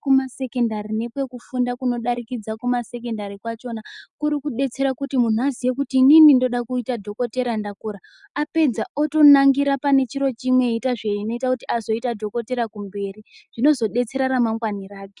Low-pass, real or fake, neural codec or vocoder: 10.8 kHz; real; none